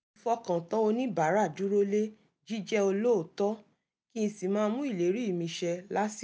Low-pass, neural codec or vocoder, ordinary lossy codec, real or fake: none; none; none; real